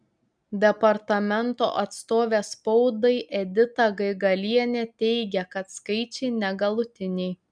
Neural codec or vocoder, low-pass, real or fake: none; 9.9 kHz; real